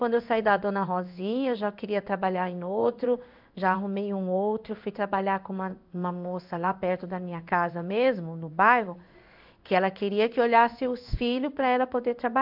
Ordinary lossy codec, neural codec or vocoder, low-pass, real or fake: AAC, 48 kbps; codec, 16 kHz in and 24 kHz out, 1 kbps, XY-Tokenizer; 5.4 kHz; fake